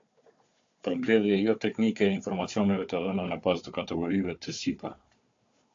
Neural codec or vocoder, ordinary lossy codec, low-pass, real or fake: codec, 16 kHz, 4 kbps, FunCodec, trained on Chinese and English, 50 frames a second; AAC, 48 kbps; 7.2 kHz; fake